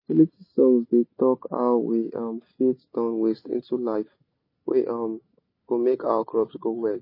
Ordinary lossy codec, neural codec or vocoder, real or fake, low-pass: MP3, 24 kbps; none; real; 5.4 kHz